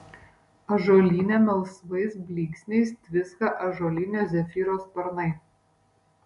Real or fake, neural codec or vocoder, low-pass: real; none; 10.8 kHz